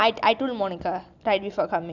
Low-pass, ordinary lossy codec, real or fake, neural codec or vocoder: 7.2 kHz; none; real; none